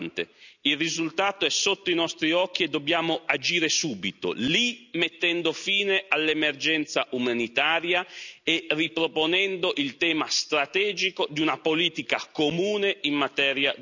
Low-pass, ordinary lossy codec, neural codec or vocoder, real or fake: 7.2 kHz; none; none; real